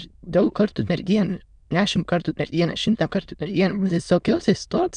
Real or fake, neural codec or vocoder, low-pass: fake; autoencoder, 22.05 kHz, a latent of 192 numbers a frame, VITS, trained on many speakers; 9.9 kHz